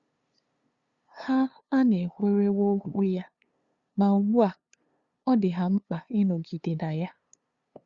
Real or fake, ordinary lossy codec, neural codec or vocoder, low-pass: fake; Opus, 64 kbps; codec, 16 kHz, 2 kbps, FunCodec, trained on LibriTTS, 25 frames a second; 7.2 kHz